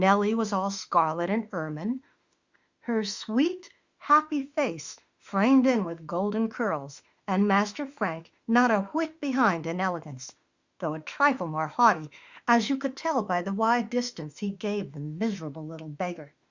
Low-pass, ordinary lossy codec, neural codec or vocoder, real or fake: 7.2 kHz; Opus, 64 kbps; autoencoder, 48 kHz, 32 numbers a frame, DAC-VAE, trained on Japanese speech; fake